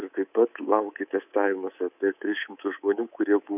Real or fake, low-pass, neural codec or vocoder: fake; 3.6 kHz; codec, 24 kHz, 3.1 kbps, DualCodec